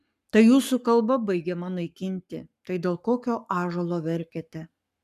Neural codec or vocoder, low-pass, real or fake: codec, 44.1 kHz, 7.8 kbps, Pupu-Codec; 14.4 kHz; fake